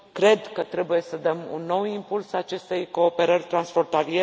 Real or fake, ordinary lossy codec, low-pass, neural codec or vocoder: real; none; none; none